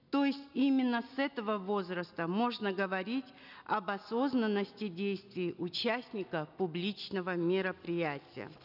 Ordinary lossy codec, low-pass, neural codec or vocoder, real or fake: none; 5.4 kHz; none; real